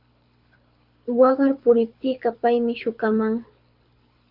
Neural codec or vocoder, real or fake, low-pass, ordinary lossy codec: codec, 24 kHz, 6 kbps, HILCodec; fake; 5.4 kHz; Opus, 64 kbps